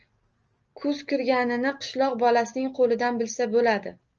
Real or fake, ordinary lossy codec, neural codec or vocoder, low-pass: real; Opus, 24 kbps; none; 7.2 kHz